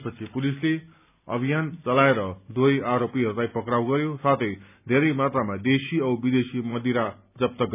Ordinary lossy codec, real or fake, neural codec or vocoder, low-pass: none; real; none; 3.6 kHz